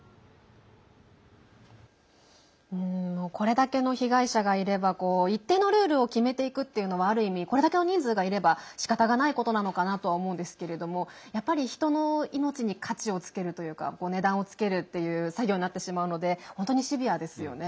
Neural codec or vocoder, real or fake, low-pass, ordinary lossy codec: none; real; none; none